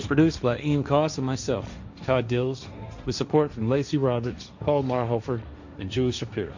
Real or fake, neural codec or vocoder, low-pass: fake; codec, 16 kHz, 1.1 kbps, Voila-Tokenizer; 7.2 kHz